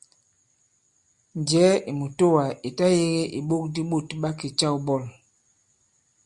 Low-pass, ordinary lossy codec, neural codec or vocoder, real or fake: 10.8 kHz; Opus, 64 kbps; none; real